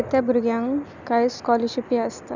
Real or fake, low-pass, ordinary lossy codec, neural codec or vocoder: real; 7.2 kHz; none; none